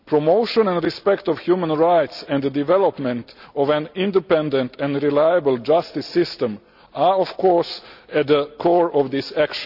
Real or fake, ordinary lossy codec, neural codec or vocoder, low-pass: real; none; none; 5.4 kHz